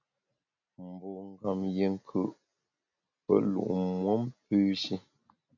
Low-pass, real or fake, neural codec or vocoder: 7.2 kHz; real; none